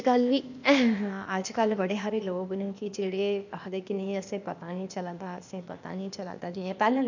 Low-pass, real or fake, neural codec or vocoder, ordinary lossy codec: 7.2 kHz; fake; codec, 16 kHz, 0.8 kbps, ZipCodec; none